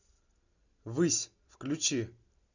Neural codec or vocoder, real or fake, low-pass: none; real; 7.2 kHz